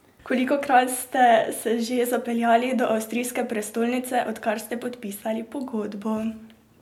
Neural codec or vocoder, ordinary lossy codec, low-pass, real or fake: none; MP3, 96 kbps; 19.8 kHz; real